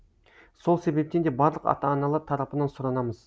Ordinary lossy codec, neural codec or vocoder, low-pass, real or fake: none; none; none; real